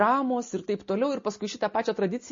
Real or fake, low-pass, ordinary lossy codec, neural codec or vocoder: real; 7.2 kHz; MP3, 32 kbps; none